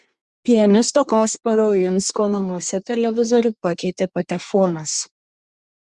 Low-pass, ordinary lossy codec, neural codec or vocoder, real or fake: 10.8 kHz; Opus, 64 kbps; codec, 24 kHz, 1 kbps, SNAC; fake